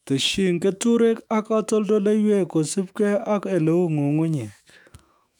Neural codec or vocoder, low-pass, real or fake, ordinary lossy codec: autoencoder, 48 kHz, 128 numbers a frame, DAC-VAE, trained on Japanese speech; 19.8 kHz; fake; none